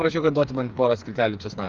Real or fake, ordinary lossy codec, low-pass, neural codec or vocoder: fake; Opus, 32 kbps; 7.2 kHz; codec, 16 kHz, 4 kbps, FreqCodec, smaller model